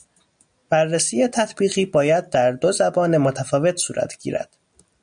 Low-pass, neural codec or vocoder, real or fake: 9.9 kHz; none; real